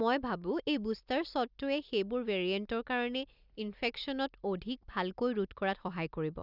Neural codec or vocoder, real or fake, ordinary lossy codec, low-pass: none; real; Opus, 64 kbps; 5.4 kHz